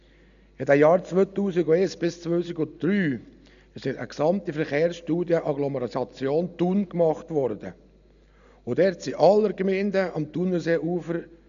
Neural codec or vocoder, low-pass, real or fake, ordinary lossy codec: none; 7.2 kHz; real; none